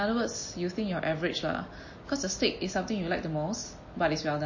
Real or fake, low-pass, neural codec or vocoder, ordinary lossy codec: real; 7.2 kHz; none; MP3, 32 kbps